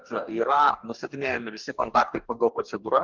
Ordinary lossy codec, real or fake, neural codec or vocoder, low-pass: Opus, 24 kbps; fake; codec, 44.1 kHz, 2.6 kbps, DAC; 7.2 kHz